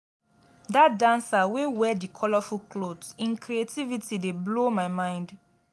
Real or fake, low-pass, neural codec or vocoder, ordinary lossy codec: real; none; none; none